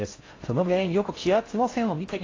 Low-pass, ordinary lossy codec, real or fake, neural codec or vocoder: 7.2 kHz; AAC, 32 kbps; fake; codec, 16 kHz in and 24 kHz out, 0.6 kbps, FocalCodec, streaming, 4096 codes